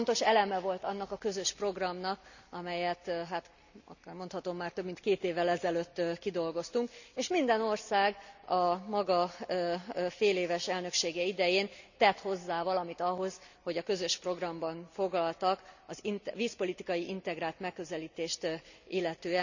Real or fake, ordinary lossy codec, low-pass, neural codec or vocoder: real; none; 7.2 kHz; none